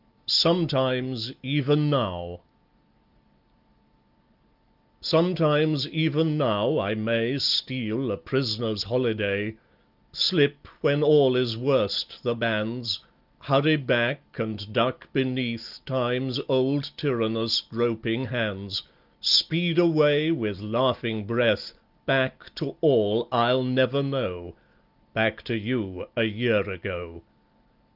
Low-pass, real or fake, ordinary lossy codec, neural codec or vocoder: 5.4 kHz; real; Opus, 32 kbps; none